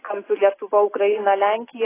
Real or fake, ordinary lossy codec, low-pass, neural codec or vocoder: real; AAC, 16 kbps; 3.6 kHz; none